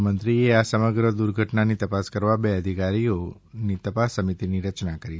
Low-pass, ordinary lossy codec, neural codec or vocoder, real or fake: none; none; none; real